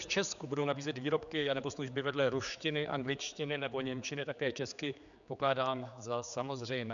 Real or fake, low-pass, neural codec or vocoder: fake; 7.2 kHz; codec, 16 kHz, 4 kbps, X-Codec, HuBERT features, trained on general audio